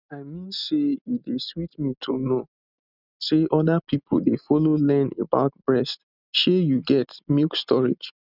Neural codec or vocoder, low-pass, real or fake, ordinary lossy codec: none; 5.4 kHz; real; none